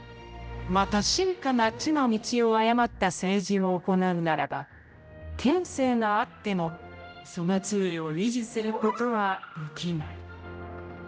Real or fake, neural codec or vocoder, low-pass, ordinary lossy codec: fake; codec, 16 kHz, 0.5 kbps, X-Codec, HuBERT features, trained on general audio; none; none